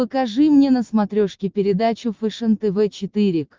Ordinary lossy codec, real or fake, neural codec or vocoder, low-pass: Opus, 32 kbps; real; none; 7.2 kHz